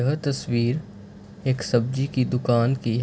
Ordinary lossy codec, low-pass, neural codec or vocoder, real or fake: none; none; none; real